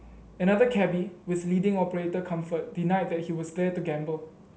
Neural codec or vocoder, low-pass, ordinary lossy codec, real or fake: none; none; none; real